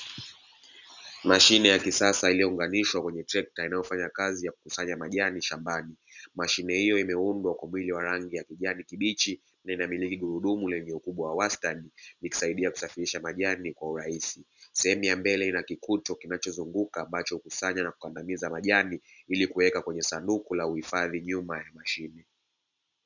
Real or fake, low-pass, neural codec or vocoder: real; 7.2 kHz; none